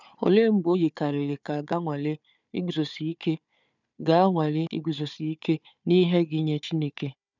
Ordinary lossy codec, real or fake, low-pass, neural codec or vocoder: none; fake; 7.2 kHz; codec, 16 kHz, 4 kbps, FunCodec, trained on Chinese and English, 50 frames a second